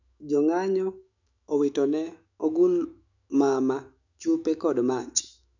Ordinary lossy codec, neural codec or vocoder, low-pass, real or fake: none; autoencoder, 48 kHz, 128 numbers a frame, DAC-VAE, trained on Japanese speech; 7.2 kHz; fake